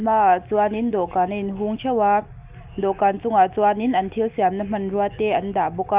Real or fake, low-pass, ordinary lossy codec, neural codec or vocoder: real; 3.6 kHz; Opus, 24 kbps; none